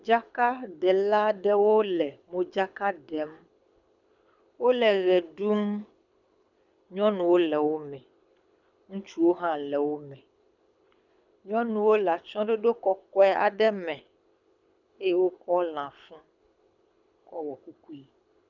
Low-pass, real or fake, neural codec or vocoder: 7.2 kHz; fake; codec, 24 kHz, 6 kbps, HILCodec